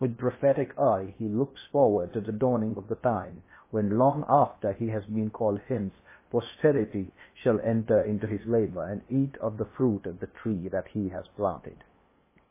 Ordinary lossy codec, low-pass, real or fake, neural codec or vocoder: MP3, 16 kbps; 3.6 kHz; fake; codec, 16 kHz in and 24 kHz out, 0.8 kbps, FocalCodec, streaming, 65536 codes